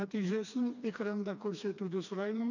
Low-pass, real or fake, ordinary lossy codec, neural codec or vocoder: 7.2 kHz; fake; none; codec, 16 kHz, 2 kbps, FreqCodec, smaller model